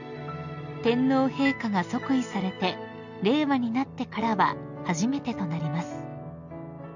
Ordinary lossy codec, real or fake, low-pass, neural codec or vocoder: none; real; 7.2 kHz; none